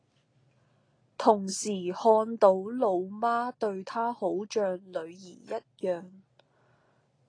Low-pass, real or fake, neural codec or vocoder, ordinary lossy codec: 9.9 kHz; real; none; AAC, 32 kbps